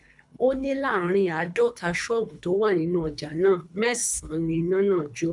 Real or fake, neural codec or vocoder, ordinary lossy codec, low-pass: fake; codec, 24 kHz, 3 kbps, HILCodec; none; 10.8 kHz